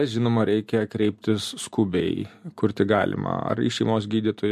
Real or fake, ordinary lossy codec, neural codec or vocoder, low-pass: real; MP3, 64 kbps; none; 14.4 kHz